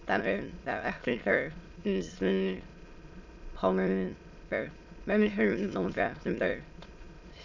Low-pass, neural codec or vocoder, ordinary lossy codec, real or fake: 7.2 kHz; autoencoder, 22.05 kHz, a latent of 192 numbers a frame, VITS, trained on many speakers; none; fake